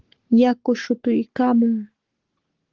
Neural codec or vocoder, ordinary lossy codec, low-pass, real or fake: codec, 44.1 kHz, 7.8 kbps, Pupu-Codec; Opus, 16 kbps; 7.2 kHz; fake